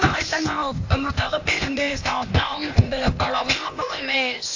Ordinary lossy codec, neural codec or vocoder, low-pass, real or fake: AAC, 48 kbps; codec, 16 kHz, 0.8 kbps, ZipCodec; 7.2 kHz; fake